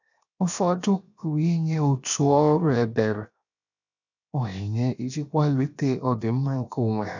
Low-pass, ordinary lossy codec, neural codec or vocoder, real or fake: 7.2 kHz; none; codec, 16 kHz, 0.7 kbps, FocalCodec; fake